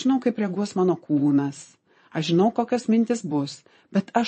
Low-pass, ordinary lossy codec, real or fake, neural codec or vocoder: 9.9 kHz; MP3, 32 kbps; fake; vocoder, 22.05 kHz, 80 mel bands, Vocos